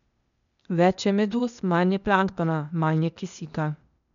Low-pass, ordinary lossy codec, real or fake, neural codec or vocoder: 7.2 kHz; none; fake; codec, 16 kHz, 0.8 kbps, ZipCodec